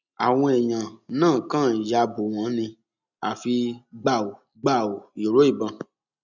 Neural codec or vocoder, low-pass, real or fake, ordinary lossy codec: none; 7.2 kHz; real; none